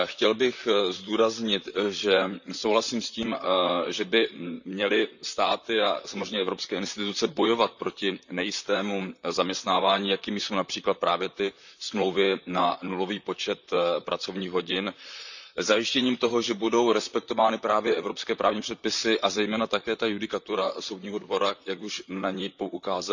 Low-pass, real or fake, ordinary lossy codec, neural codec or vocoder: 7.2 kHz; fake; none; vocoder, 44.1 kHz, 128 mel bands, Pupu-Vocoder